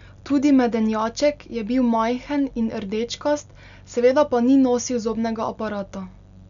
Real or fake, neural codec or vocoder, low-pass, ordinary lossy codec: real; none; 7.2 kHz; none